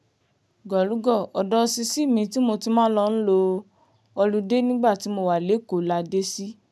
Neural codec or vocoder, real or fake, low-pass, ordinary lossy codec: none; real; none; none